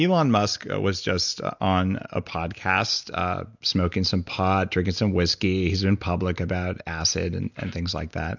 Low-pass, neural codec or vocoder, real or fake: 7.2 kHz; none; real